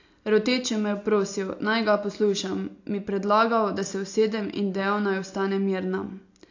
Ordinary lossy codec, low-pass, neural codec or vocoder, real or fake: AAC, 48 kbps; 7.2 kHz; none; real